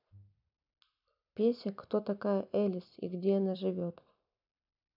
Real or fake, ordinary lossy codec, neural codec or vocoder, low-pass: fake; none; vocoder, 44.1 kHz, 128 mel bands every 256 samples, BigVGAN v2; 5.4 kHz